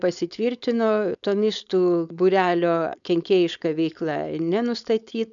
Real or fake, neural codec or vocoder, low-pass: fake; codec, 16 kHz, 4.8 kbps, FACodec; 7.2 kHz